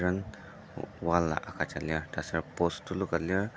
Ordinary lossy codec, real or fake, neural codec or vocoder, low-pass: none; real; none; none